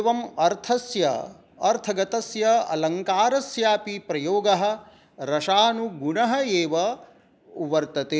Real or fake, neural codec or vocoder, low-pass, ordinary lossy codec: real; none; none; none